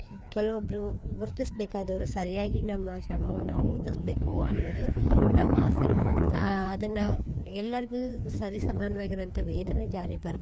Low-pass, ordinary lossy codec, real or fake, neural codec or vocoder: none; none; fake; codec, 16 kHz, 2 kbps, FreqCodec, larger model